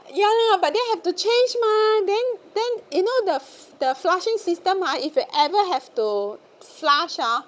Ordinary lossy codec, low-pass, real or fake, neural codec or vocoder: none; none; fake; codec, 16 kHz, 16 kbps, FunCodec, trained on Chinese and English, 50 frames a second